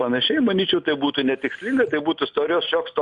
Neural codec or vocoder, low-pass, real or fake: none; 10.8 kHz; real